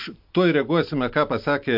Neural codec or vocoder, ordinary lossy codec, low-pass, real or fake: none; MP3, 48 kbps; 5.4 kHz; real